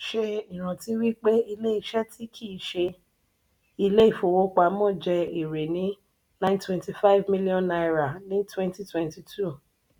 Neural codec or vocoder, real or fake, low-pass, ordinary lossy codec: none; real; 19.8 kHz; none